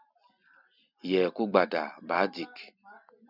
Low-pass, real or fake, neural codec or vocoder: 5.4 kHz; real; none